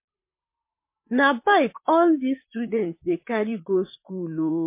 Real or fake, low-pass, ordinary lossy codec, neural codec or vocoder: fake; 3.6 kHz; MP3, 24 kbps; vocoder, 44.1 kHz, 128 mel bands, Pupu-Vocoder